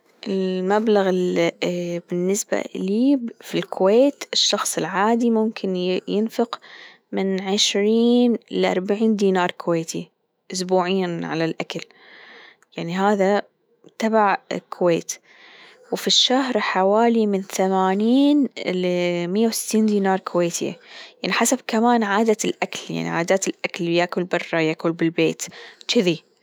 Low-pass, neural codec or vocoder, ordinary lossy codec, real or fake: none; autoencoder, 48 kHz, 128 numbers a frame, DAC-VAE, trained on Japanese speech; none; fake